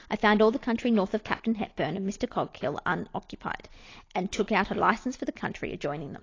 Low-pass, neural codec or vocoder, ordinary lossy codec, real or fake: 7.2 kHz; none; AAC, 32 kbps; real